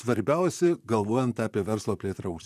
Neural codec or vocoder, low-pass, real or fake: vocoder, 44.1 kHz, 128 mel bands, Pupu-Vocoder; 14.4 kHz; fake